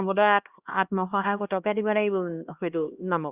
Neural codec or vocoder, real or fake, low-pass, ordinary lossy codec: codec, 16 kHz, 1 kbps, X-Codec, HuBERT features, trained on LibriSpeech; fake; 3.6 kHz; Opus, 64 kbps